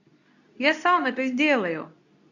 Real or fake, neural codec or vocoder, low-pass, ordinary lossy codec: fake; codec, 24 kHz, 0.9 kbps, WavTokenizer, medium speech release version 2; 7.2 kHz; none